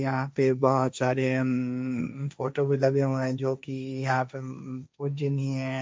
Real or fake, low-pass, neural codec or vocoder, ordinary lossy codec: fake; none; codec, 16 kHz, 1.1 kbps, Voila-Tokenizer; none